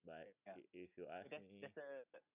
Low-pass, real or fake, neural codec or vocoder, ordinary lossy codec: 3.6 kHz; real; none; none